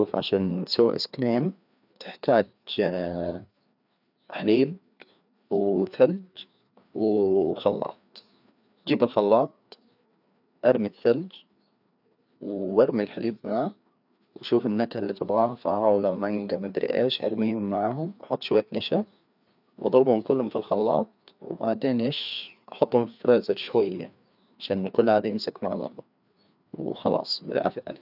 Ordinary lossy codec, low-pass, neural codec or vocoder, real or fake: none; 5.4 kHz; codec, 16 kHz, 2 kbps, FreqCodec, larger model; fake